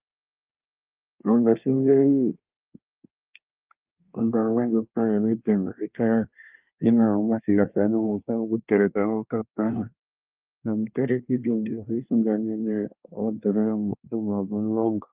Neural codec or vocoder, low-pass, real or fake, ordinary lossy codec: codec, 24 kHz, 1 kbps, SNAC; 3.6 kHz; fake; Opus, 32 kbps